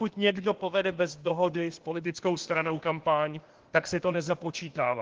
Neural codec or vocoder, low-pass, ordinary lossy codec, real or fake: codec, 16 kHz, 0.8 kbps, ZipCodec; 7.2 kHz; Opus, 16 kbps; fake